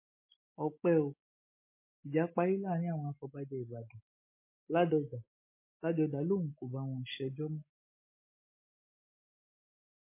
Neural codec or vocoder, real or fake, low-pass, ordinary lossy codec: none; real; 3.6 kHz; MP3, 24 kbps